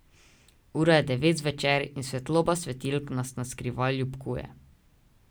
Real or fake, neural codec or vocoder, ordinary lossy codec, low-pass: real; none; none; none